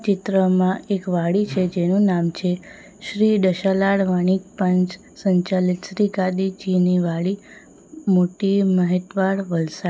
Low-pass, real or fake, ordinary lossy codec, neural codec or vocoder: none; real; none; none